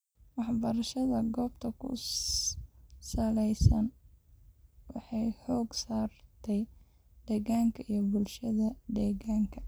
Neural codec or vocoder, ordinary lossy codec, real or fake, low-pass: none; none; real; none